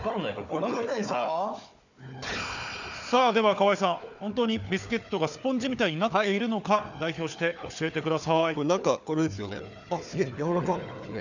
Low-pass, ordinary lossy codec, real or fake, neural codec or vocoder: 7.2 kHz; none; fake; codec, 16 kHz, 4 kbps, FunCodec, trained on Chinese and English, 50 frames a second